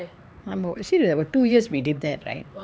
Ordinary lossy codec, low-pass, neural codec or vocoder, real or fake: none; none; codec, 16 kHz, 4 kbps, X-Codec, HuBERT features, trained on LibriSpeech; fake